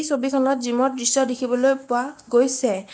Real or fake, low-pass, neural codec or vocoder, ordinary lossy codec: real; none; none; none